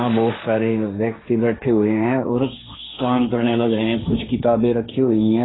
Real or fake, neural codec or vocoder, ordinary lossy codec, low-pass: fake; codec, 16 kHz, 1.1 kbps, Voila-Tokenizer; AAC, 16 kbps; 7.2 kHz